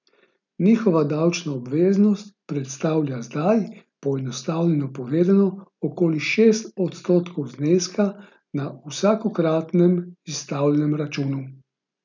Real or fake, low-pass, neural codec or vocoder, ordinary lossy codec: real; 7.2 kHz; none; none